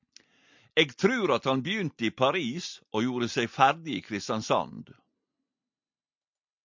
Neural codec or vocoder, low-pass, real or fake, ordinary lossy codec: none; 7.2 kHz; real; MP3, 64 kbps